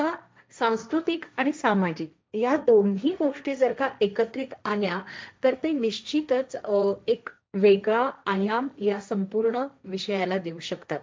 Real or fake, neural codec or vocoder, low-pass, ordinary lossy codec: fake; codec, 16 kHz, 1.1 kbps, Voila-Tokenizer; none; none